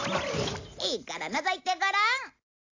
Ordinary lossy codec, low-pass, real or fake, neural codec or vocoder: AAC, 48 kbps; 7.2 kHz; real; none